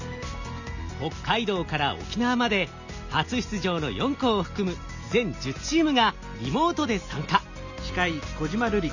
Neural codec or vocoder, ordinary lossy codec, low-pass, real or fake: none; none; 7.2 kHz; real